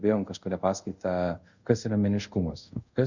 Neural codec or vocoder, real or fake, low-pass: codec, 24 kHz, 0.5 kbps, DualCodec; fake; 7.2 kHz